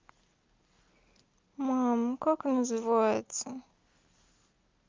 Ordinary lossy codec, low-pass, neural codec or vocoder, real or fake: Opus, 24 kbps; 7.2 kHz; none; real